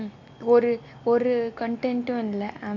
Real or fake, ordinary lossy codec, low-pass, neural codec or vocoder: real; AAC, 48 kbps; 7.2 kHz; none